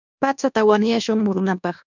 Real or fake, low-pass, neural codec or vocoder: fake; 7.2 kHz; codec, 24 kHz, 1.2 kbps, DualCodec